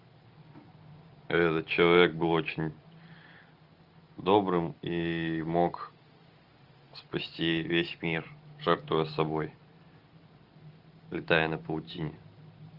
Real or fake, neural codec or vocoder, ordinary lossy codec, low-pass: real; none; Opus, 64 kbps; 5.4 kHz